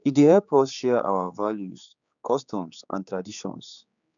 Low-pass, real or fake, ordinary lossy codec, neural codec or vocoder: 7.2 kHz; fake; none; codec, 16 kHz, 4 kbps, X-Codec, HuBERT features, trained on general audio